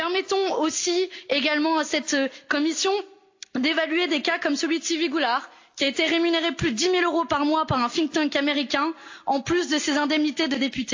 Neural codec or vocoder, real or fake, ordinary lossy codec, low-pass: none; real; AAC, 48 kbps; 7.2 kHz